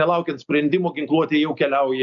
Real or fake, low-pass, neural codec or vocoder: real; 7.2 kHz; none